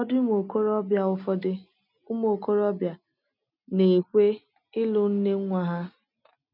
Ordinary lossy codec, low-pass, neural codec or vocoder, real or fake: none; 5.4 kHz; none; real